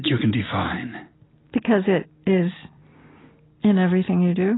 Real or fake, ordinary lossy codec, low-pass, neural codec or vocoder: real; AAC, 16 kbps; 7.2 kHz; none